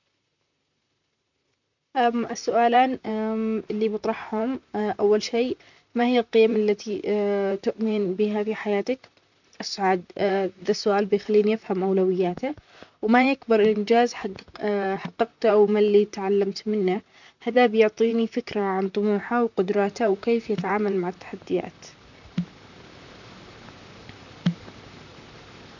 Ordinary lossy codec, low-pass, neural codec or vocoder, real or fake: none; 7.2 kHz; vocoder, 44.1 kHz, 128 mel bands, Pupu-Vocoder; fake